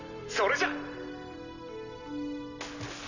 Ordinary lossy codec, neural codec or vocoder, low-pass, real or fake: none; none; 7.2 kHz; real